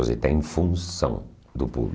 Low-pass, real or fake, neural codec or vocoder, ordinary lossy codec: none; real; none; none